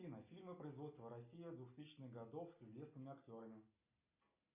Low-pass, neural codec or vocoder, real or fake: 3.6 kHz; none; real